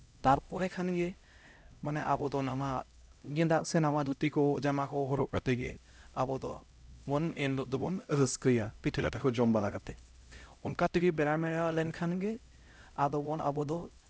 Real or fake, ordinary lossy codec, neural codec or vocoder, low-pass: fake; none; codec, 16 kHz, 0.5 kbps, X-Codec, HuBERT features, trained on LibriSpeech; none